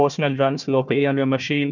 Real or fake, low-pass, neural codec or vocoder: fake; 7.2 kHz; codec, 16 kHz, 1 kbps, FunCodec, trained on Chinese and English, 50 frames a second